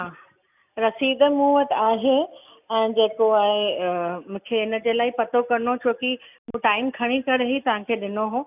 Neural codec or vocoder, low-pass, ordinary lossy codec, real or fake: none; 3.6 kHz; none; real